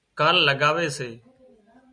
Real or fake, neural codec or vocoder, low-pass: real; none; 9.9 kHz